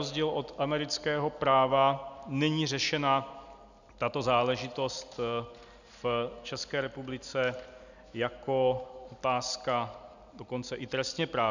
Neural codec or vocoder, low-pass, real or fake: none; 7.2 kHz; real